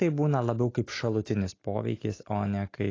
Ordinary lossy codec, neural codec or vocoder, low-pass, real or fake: AAC, 32 kbps; none; 7.2 kHz; real